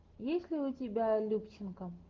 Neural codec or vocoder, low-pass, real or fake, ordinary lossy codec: none; 7.2 kHz; real; Opus, 16 kbps